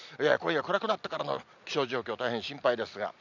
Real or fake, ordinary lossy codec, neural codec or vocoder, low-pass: real; none; none; 7.2 kHz